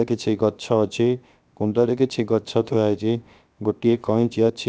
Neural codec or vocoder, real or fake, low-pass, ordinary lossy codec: codec, 16 kHz, 0.7 kbps, FocalCodec; fake; none; none